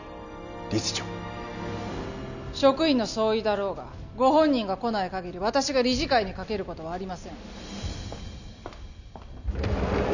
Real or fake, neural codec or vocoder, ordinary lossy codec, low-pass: real; none; none; 7.2 kHz